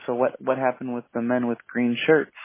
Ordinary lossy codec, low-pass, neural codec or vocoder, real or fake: MP3, 16 kbps; 3.6 kHz; none; real